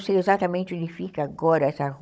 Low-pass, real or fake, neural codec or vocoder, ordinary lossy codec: none; fake; codec, 16 kHz, 16 kbps, FunCodec, trained on Chinese and English, 50 frames a second; none